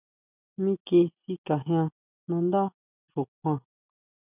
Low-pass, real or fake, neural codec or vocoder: 3.6 kHz; real; none